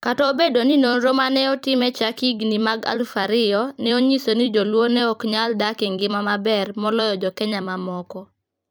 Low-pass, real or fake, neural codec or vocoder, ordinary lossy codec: none; fake; vocoder, 44.1 kHz, 128 mel bands every 256 samples, BigVGAN v2; none